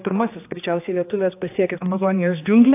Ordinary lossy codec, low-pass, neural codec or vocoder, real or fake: AAC, 24 kbps; 3.6 kHz; codec, 16 kHz, 2 kbps, X-Codec, HuBERT features, trained on general audio; fake